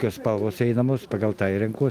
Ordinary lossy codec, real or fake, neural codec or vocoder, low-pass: Opus, 32 kbps; real; none; 14.4 kHz